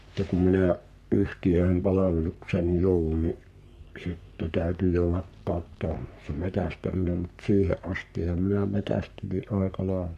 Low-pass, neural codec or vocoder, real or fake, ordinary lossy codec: 14.4 kHz; codec, 44.1 kHz, 3.4 kbps, Pupu-Codec; fake; none